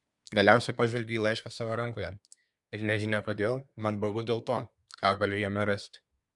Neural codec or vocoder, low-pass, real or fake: codec, 24 kHz, 1 kbps, SNAC; 10.8 kHz; fake